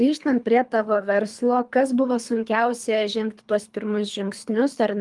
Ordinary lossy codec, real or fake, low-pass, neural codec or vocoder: Opus, 32 kbps; fake; 10.8 kHz; codec, 24 kHz, 3 kbps, HILCodec